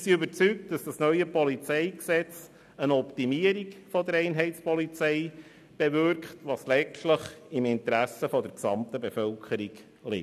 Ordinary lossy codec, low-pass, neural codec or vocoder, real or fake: none; 14.4 kHz; none; real